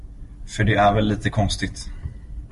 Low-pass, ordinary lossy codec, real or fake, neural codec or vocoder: 14.4 kHz; MP3, 48 kbps; real; none